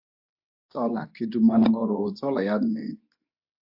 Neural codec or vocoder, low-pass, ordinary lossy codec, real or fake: codec, 24 kHz, 0.9 kbps, WavTokenizer, medium speech release version 2; 5.4 kHz; AAC, 48 kbps; fake